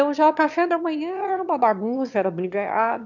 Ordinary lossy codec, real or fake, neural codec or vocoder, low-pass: none; fake; autoencoder, 22.05 kHz, a latent of 192 numbers a frame, VITS, trained on one speaker; 7.2 kHz